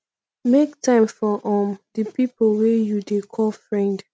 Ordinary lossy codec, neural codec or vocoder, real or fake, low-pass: none; none; real; none